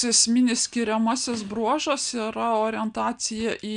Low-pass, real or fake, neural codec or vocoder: 9.9 kHz; real; none